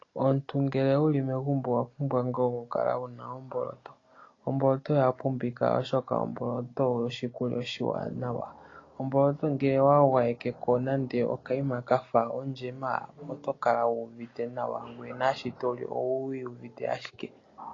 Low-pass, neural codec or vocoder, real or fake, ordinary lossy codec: 7.2 kHz; none; real; AAC, 32 kbps